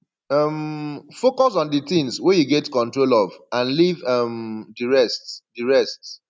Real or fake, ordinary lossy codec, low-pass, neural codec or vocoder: real; none; none; none